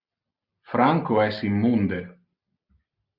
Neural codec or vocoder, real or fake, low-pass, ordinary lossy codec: none; real; 5.4 kHz; Opus, 64 kbps